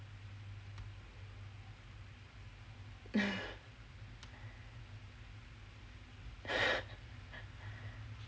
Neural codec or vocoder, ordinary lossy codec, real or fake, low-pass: none; none; real; none